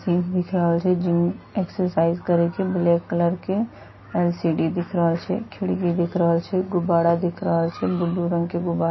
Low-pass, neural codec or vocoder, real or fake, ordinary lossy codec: 7.2 kHz; none; real; MP3, 24 kbps